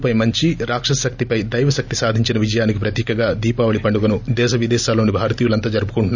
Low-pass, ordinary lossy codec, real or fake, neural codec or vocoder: 7.2 kHz; none; real; none